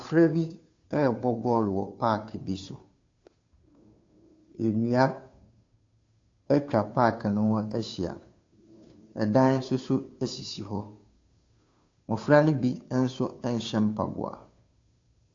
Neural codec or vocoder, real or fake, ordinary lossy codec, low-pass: codec, 16 kHz, 2 kbps, FunCodec, trained on Chinese and English, 25 frames a second; fake; AAC, 48 kbps; 7.2 kHz